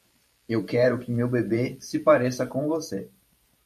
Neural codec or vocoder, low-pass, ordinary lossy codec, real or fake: vocoder, 44.1 kHz, 128 mel bands every 512 samples, BigVGAN v2; 14.4 kHz; MP3, 64 kbps; fake